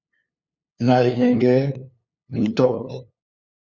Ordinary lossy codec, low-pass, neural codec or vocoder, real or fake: Opus, 64 kbps; 7.2 kHz; codec, 16 kHz, 2 kbps, FunCodec, trained on LibriTTS, 25 frames a second; fake